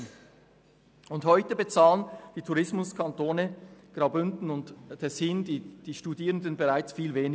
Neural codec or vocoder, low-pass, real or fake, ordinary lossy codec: none; none; real; none